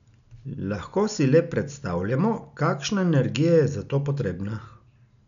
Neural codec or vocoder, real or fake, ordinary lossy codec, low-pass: none; real; none; 7.2 kHz